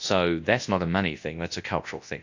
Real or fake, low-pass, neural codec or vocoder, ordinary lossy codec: fake; 7.2 kHz; codec, 24 kHz, 0.9 kbps, WavTokenizer, large speech release; AAC, 48 kbps